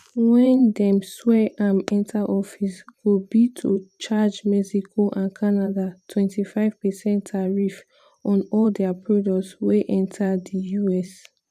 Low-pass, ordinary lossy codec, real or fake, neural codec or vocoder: 14.4 kHz; none; fake; vocoder, 44.1 kHz, 128 mel bands every 512 samples, BigVGAN v2